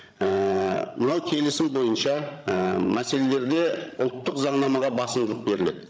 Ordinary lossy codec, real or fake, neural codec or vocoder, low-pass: none; fake; codec, 16 kHz, 16 kbps, FreqCodec, larger model; none